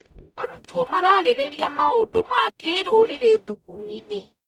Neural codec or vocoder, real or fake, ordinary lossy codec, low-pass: codec, 44.1 kHz, 0.9 kbps, DAC; fake; none; 19.8 kHz